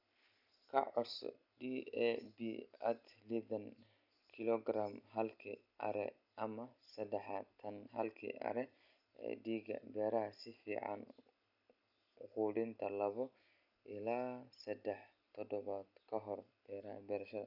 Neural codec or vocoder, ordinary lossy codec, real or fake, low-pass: none; none; real; 5.4 kHz